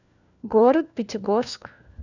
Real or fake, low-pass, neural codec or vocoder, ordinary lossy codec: fake; 7.2 kHz; codec, 16 kHz, 1 kbps, FunCodec, trained on LibriTTS, 50 frames a second; none